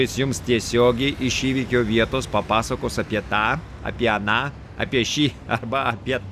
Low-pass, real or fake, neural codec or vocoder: 14.4 kHz; real; none